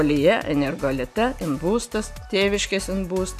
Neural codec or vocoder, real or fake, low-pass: none; real; 14.4 kHz